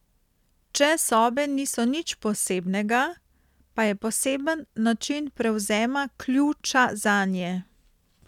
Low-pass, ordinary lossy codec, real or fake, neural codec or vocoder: 19.8 kHz; none; real; none